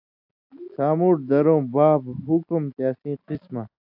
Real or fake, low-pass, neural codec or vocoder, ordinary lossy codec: real; 5.4 kHz; none; AAC, 48 kbps